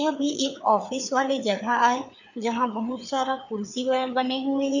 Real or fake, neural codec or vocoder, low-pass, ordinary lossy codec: fake; codec, 16 kHz, 4 kbps, FreqCodec, larger model; 7.2 kHz; none